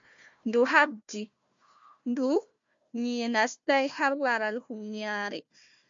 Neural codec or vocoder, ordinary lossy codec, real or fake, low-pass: codec, 16 kHz, 1 kbps, FunCodec, trained on Chinese and English, 50 frames a second; MP3, 48 kbps; fake; 7.2 kHz